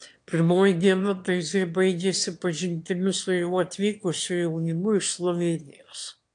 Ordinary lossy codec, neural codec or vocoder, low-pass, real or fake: AAC, 64 kbps; autoencoder, 22.05 kHz, a latent of 192 numbers a frame, VITS, trained on one speaker; 9.9 kHz; fake